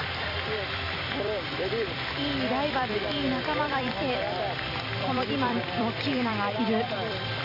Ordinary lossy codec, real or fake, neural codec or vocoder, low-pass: none; fake; vocoder, 44.1 kHz, 128 mel bands every 256 samples, BigVGAN v2; 5.4 kHz